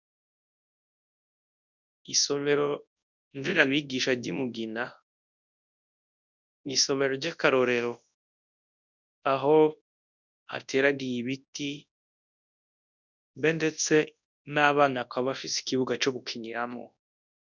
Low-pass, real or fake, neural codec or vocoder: 7.2 kHz; fake; codec, 24 kHz, 0.9 kbps, WavTokenizer, large speech release